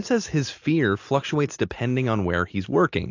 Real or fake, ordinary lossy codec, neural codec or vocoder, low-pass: real; AAC, 48 kbps; none; 7.2 kHz